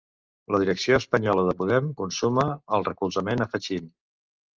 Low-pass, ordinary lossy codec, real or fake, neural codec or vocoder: 7.2 kHz; Opus, 32 kbps; real; none